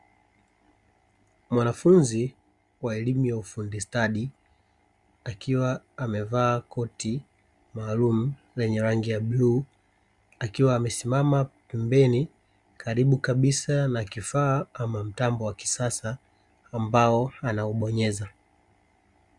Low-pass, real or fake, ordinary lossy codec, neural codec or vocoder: 10.8 kHz; real; Opus, 64 kbps; none